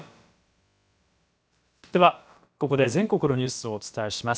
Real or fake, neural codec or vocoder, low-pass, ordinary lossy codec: fake; codec, 16 kHz, about 1 kbps, DyCAST, with the encoder's durations; none; none